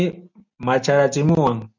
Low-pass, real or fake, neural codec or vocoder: 7.2 kHz; real; none